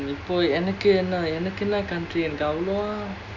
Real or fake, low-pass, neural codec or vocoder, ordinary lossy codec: real; 7.2 kHz; none; none